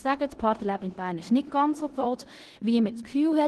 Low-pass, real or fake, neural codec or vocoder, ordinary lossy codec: 10.8 kHz; fake; codec, 16 kHz in and 24 kHz out, 0.9 kbps, LongCat-Audio-Codec, four codebook decoder; Opus, 16 kbps